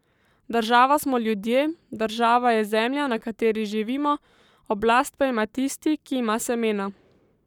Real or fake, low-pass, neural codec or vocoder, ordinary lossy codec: fake; 19.8 kHz; codec, 44.1 kHz, 7.8 kbps, Pupu-Codec; none